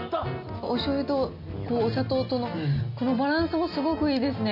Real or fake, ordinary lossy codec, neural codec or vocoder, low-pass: real; AAC, 48 kbps; none; 5.4 kHz